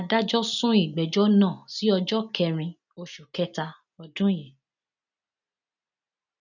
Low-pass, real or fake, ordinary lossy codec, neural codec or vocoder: 7.2 kHz; real; none; none